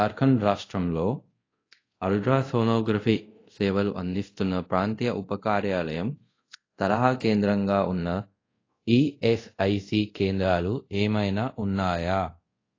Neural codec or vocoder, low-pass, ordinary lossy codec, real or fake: codec, 24 kHz, 0.5 kbps, DualCodec; 7.2 kHz; AAC, 32 kbps; fake